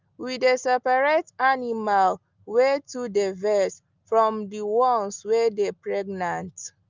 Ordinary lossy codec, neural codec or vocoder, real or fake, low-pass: Opus, 24 kbps; none; real; 7.2 kHz